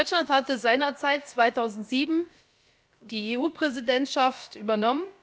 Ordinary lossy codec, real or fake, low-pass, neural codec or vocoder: none; fake; none; codec, 16 kHz, about 1 kbps, DyCAST, with the encoder's durations